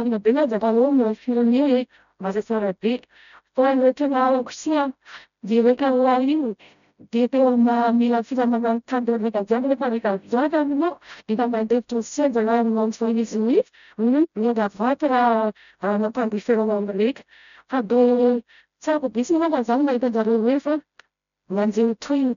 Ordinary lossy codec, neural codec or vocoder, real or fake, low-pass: none; codec, 16 kHz, 0.5 kbps, FreqCodec, smaller model; fake; 7.2 kHz